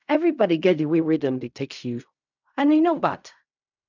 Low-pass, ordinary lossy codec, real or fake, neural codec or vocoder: 7.2 kHz; none; fake; codec, 16 kHz in and 24 kHz out, 0.4 kbps, LongCat-Audio-Codec, fine tuned four codebook decoder